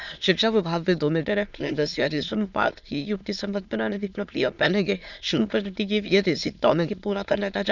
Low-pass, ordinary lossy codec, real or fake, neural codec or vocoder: 7.2 kHz; none; fake; autoencoder, 22.05 kHz, a latent of 192 numbers a frame, VITS, trained on many speakers